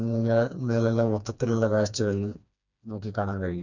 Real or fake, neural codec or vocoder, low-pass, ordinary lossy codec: fake; codec, 16 kHz, 2 kbps, FreqCodec, smaller model; 7.2 kHz; none